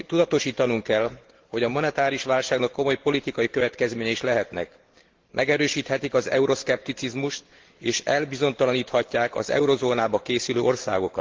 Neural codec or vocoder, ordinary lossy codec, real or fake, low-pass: none; Opus, 16 kbps; real; 7.2 kHz